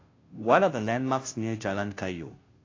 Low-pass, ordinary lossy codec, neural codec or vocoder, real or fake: 7.2 kHz; AAC, 32 kbps; codec, 16 kHz, 0.5 kbps, FunCodec, trained on Chinese and English, 25 frames a second; fake